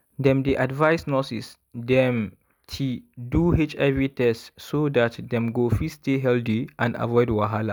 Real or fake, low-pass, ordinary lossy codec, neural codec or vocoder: real; none; none; none